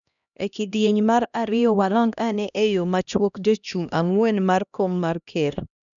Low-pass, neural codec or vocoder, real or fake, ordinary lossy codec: 7.2 kHz; codec, 16 kHz, 1 kbps, X-Codec, HuBERT features, trained on LibriSpeech; fake; none